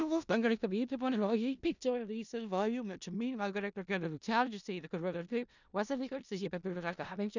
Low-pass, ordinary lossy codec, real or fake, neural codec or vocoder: 7.2 kHz; none; fake; codec, 16 kHz in and 24 kHz out, 0.4 kbps, LongCat-Audio-Codec, four codebook decoder